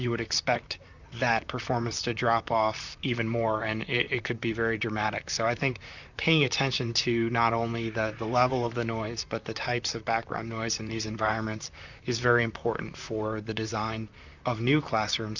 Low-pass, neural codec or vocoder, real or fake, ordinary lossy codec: 7.2 kHz; vocoder, 44.1 kHz, 128 mel bands, Pupu-Vocoder; fake; Opus, 64 kbps